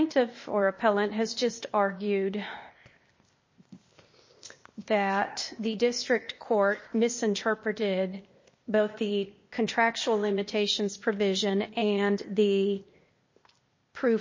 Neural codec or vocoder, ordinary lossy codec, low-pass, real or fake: codec, 16 kHz, 0.8 kbps, ZipCodec; MP3, 32 kbps; 7.2 kHz; fake